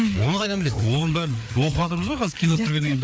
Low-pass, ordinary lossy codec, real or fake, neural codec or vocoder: none; none; fake; codec, 16 kHz, 4 kbps, FreqCodec, larger model